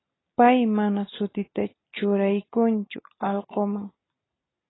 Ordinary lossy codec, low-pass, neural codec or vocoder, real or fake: AAC, 16 kbps; 7.2 kHz; none; real